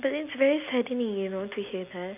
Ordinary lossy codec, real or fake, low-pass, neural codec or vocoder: none; real; 3.6 kHz; none